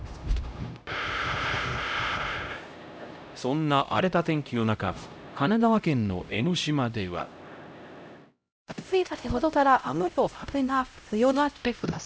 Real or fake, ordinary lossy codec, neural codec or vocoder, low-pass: fake; none; codec, 16 kHz, 0.5 kbps, X-Codec, HuBERT features, trained on LibriSpeech; none